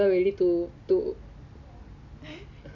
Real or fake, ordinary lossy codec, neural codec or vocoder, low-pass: real; none; none; 7.2 kHz